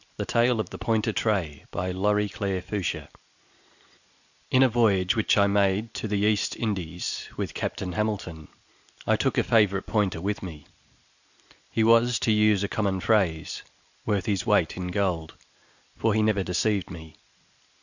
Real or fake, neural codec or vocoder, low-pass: real; none; 7.2 kHz